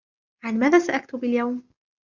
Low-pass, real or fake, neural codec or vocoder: 7.2 kHz; real; none